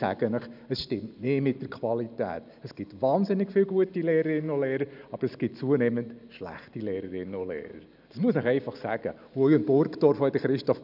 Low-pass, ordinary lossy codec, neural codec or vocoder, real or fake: 5.4 kHz; none; none; real